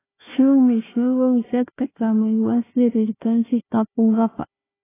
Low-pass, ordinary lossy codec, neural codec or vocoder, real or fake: 3.6 kHz; AAC, 16 kbps; codec, 16 kHz, 1 kbps, FunCodec, trained on Chinese and English, 50 frames a second; fake